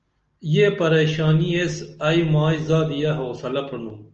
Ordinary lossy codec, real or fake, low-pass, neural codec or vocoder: Opus, 24 kbps; real; 7.2 kHz; none